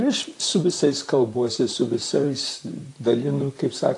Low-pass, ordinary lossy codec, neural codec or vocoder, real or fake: 10.8 kHz; AAC, 48 kbps; vocoder, 44.1 kHz, 128 mel bands, Pupu-Vocoder; fake